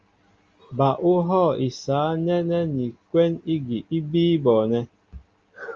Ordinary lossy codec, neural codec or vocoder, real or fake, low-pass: Opus, 32 kbps; none; real; 7.2 kHz